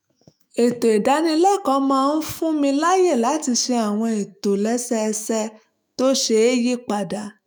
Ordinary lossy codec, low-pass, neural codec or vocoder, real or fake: none; none; autoencoder, 48 kHz, 128 numbers a frame, DAC-VAE, trained on Japanese speech; fake